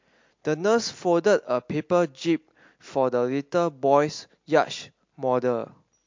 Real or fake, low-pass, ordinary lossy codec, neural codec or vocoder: real; 7.2 kHz; MP3, 48 kbps; none